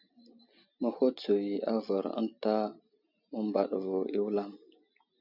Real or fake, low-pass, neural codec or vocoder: real; 5.4 kHz; none